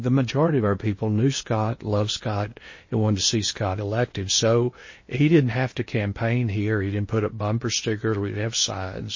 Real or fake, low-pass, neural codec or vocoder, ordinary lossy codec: fake; 7.2 kHz; codec, 16 kHz in and 24 kHz out, 0.8 kbps, FocalCodec, streaming, 65536 codes; MP3, 32 kbps